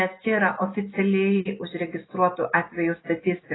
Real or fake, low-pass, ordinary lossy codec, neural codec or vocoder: real; 7.2 kHz; AAC, 16 kbps; none